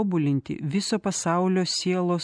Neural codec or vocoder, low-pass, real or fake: none; 9.9 kHz; real